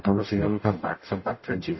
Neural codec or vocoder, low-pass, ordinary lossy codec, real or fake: codec, 44.1 kHz, 0.9 kbps, DAC; 7.2 kHz; MP3, 24 kbps; fake